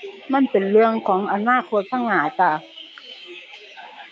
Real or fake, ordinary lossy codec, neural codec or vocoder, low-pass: fake; none; codec, 16 kHz, 6 kbps, DAC; none